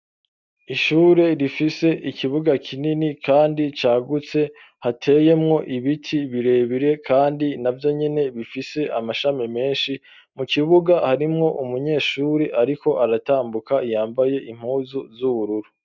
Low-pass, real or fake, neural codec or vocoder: 7.2 kHz; real; none